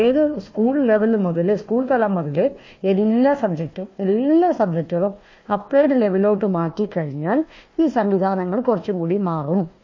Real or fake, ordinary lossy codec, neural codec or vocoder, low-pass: fake; MP3, 32 kbps; codec, 16 kHz, 1 kbps, FunCodec, trained on Chinese and English, 50 frames a second; 7.2 kHz